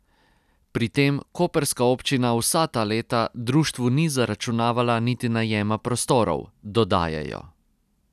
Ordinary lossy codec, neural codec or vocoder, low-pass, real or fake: none; none; 14.4 kHz; real